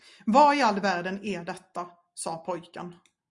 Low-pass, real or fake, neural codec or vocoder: 10.8 kHz; real; none